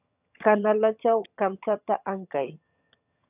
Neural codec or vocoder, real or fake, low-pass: vocoder, 22.05 kHz, 80 mel bands, HiFi-GAN; fake; 3.6 kHz